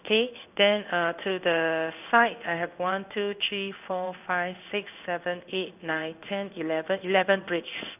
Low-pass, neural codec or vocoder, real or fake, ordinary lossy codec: 3.6 kHz; codec, 16 kHz in and 24 kHz out, 1 kbps, XY-Tokenizer; fake; none